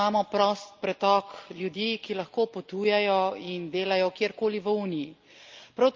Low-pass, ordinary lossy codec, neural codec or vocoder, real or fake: 7.2 kHz; Opus, 24 kbps; none; real